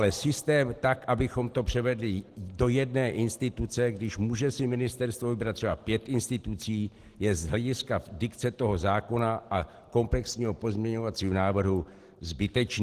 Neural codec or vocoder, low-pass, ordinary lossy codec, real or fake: none; 14.4 kHz; Opus, 16 kbps; real